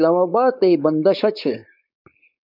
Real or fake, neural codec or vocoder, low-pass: fake; codec, 16 kHz, 4.8 kbps, FACodec; 5.4 kHz